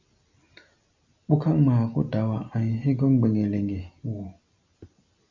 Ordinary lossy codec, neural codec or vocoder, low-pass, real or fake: MP3, 64 kbps; none; 7.2 kHz; real